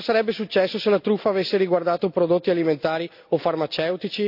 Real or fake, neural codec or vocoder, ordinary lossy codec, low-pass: real; none; AAC, 48 kbps; 5.4 kHz